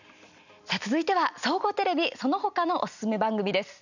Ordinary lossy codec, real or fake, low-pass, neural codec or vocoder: none; real; 7.2 kHz; none